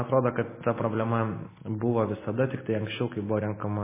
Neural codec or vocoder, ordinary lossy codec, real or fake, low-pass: none; MP3, 16 kbps; real; 3.6 kHz